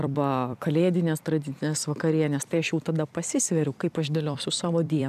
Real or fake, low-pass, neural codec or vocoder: fake; 14.4 kHz; vocoder, 44.1 kHz, 128 mel bands every 256 samples, BigVGAN v2